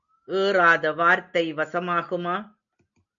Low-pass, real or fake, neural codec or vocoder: 7.2 kHz; real; none